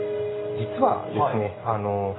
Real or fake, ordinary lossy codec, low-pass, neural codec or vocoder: real; AAC, 16 kbps; 7.2 kHz; none